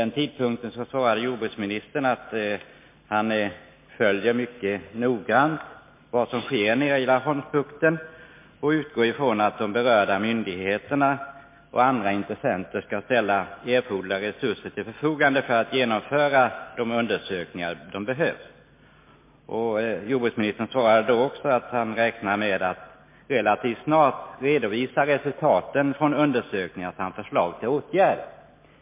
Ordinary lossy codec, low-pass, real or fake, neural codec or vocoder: MP3, 24 kbps; 3.6 kHz; real; none